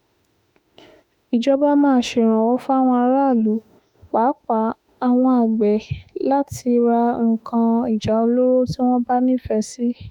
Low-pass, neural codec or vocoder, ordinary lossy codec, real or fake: 19.8 kHz; autoencoder, 48 kHz, 32 numbers a frame, DAC-VAE, trained on Japanese speech; none; fake